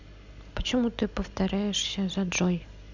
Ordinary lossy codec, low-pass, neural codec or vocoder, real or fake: Opus, 64 kbps; 7.2 kHz; none; real